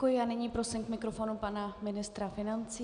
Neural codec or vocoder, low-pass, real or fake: none; 9.9 kHz; real